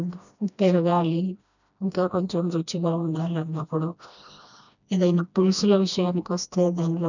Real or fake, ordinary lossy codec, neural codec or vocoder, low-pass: fake; none; codec, 16 kHz, 1 kbps, FreqCodec, smaller model; 7.2 kHz